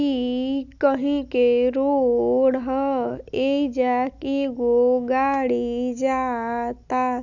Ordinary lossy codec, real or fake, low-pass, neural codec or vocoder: none; real; 7.2 kHz; none